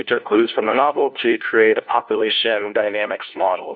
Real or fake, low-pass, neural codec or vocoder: fake; 7.2 kHz; codec, 16 kHz, 1 kbps, FunCodec, trained on LibriTTS, 50 frames a second